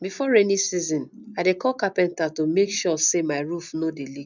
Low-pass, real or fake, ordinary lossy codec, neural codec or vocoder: 7.2 kHz; real; none; none